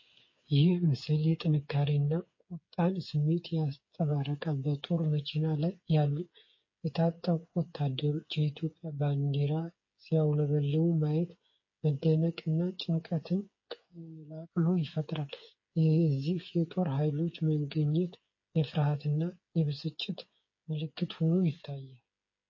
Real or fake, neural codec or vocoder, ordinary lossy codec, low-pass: fake; codec, 16 kHz, 8 kbps, FreqCodec, smaller model; MP3, 32 kbps; 7.2 kHz